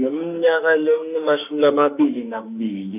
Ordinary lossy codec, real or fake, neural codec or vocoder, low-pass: none; fake; autoencoder, 48 kHz, 32 numbers a frame, DAC-VAE, trained on Japanese speech; 3.6 kHz